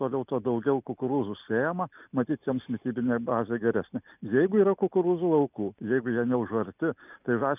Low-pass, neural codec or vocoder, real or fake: 3.6 kHz; none; real